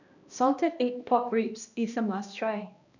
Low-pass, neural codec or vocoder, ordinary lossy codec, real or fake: 7.2 kHz; codec, 16 kHz, 1 kbps, X-Codec, HuBERT features, trained on balanced general audio; none; fake